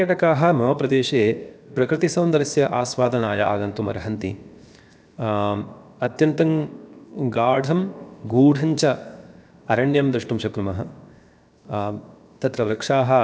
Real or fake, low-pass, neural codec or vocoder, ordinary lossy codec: fake; none; codec, 16 kHz, 0.7 kbps, FocalCodec; none